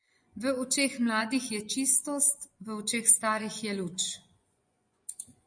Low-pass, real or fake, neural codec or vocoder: 9.9 kHz; fake; vocoder, 24 kHz, 100 mel bands, Vocos